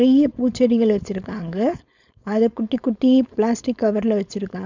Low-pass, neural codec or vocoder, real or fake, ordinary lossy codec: 7.2 kHz; codec, 16 kHz, 4.8 kbps, FACodec; fake; none